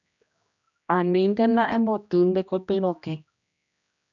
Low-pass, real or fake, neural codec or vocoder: 7.2 kHz; fake; codec, 16 kHz, 1 kbps, X-Codec, HuBERT features, trained on general audio